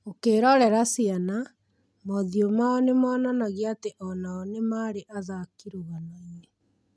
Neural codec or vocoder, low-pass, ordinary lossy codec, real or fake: none; none; none; real